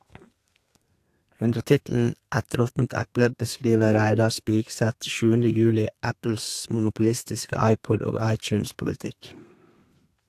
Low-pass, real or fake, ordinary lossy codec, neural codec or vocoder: 14.4 kHz; fake; AAC, 64 kbps; codec, 32 kHz, 1.9 kbps, SNAC